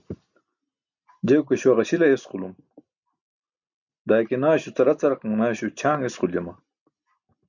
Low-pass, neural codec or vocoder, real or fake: 7.2 kHz; none; real